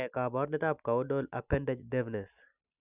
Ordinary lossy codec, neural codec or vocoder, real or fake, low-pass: none; none; real; 3.6 kHz